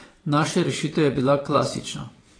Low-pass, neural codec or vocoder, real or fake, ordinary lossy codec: 9.9 kHz; vocoder, 22.05 kHz, 80 mel bands, WaveNeXt; fake; AAC, 32 kbps